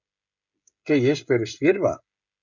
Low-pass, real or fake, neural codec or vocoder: 7.2 kHz; fake; codec, 16 kHz, 16 kbps, FreqCodec, smaller model